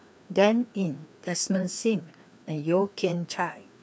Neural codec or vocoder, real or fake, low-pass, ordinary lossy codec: codec, 16 kHz, 2 kbps, FreqCodec, larger model; fake; none; none